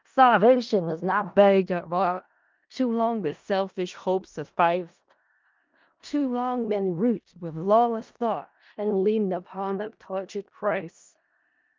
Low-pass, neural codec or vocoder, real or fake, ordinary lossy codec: 7.2 kHz; codec, 16 kHz in and 24 kHz out, 0.4 kbps, LongCat-Audio-Codec, four codebook decoder; fake; Opus, 24 kbps